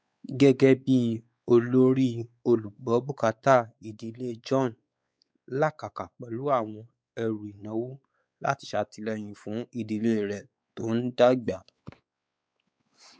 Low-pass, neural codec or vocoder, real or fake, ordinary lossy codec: none; codec, 16 kHz, 4 kbps, X-Codec, WavLM features, trained on Multilingual LibriSpeech; fake; none